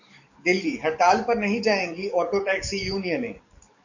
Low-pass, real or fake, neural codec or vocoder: 7.2 kHz; fake; codec, 44.1 kHz, 7.8 kbps, DAC